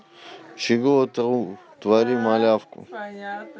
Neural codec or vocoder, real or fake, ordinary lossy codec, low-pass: none; real; none; none